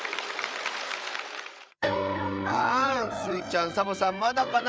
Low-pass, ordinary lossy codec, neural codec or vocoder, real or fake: none; none; codec, 16 kHz, 8 kbps, FreqCodec, larger model; fake